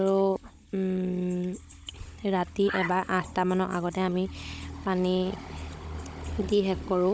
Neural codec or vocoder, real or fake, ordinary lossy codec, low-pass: codec, 16 kHz, 16 kbps, FunCodec, trained on Chinese and English, 50 frames a second; fake; none; none